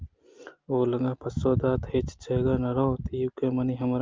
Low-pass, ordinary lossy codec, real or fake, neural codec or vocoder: 7.2 kHz; Opus, 24 kbps; real; none